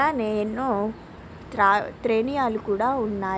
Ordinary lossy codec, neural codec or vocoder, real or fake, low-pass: none; none; real; none